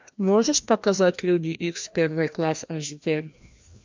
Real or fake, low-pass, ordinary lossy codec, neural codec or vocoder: fake; 7.2 kHz; MP3, 64 kbps; codec, 16 kHz, 1 kbps, FreqCodec, larger model